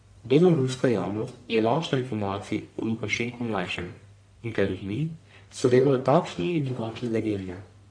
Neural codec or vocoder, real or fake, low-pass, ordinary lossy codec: codec, 44.1 kHz, 1.7 kbps, Pupu-Codec; fake; 9.9 kHz; AAC, 64 kbps